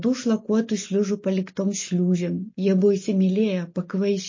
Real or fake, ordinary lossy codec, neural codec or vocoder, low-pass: real; MP3, 32 kbps; none; 7.2 kHz